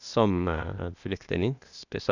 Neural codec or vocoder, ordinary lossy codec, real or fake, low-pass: codec, 16 kHz, 0.8 kbps, ZipCodec; none; fake; 7.2 kHz